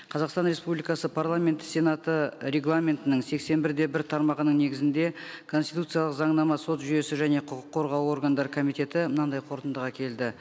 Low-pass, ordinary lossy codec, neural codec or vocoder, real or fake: none; none; none; real